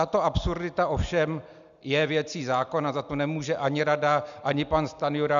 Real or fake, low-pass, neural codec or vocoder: real; 7.2 kHz; none